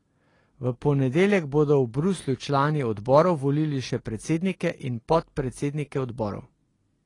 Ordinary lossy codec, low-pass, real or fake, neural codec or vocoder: AAC, 32 kbps; 10.8 kHz; real; none